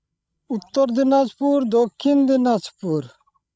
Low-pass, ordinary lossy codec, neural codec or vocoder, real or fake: none; none; codec, 16 kHz, 16 kbps, FreqCodec, larger model; fake